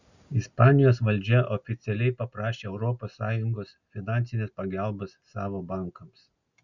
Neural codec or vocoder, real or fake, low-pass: none; real; 7.2 kHz